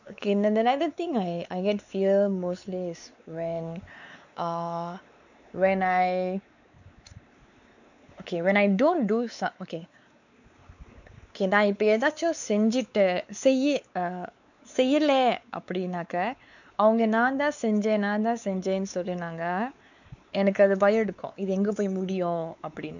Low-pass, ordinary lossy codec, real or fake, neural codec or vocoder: 7.2 kHz; none; fake; codec, 16 kHz, 4 kbps, X-Codec, WavLM features, trained on Multilingual LibriSpeech